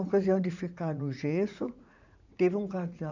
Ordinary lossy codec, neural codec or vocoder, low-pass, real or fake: none; vocoder, 44.1 kHz, 80 mel bands, Vocos; 7.2 kHz; fake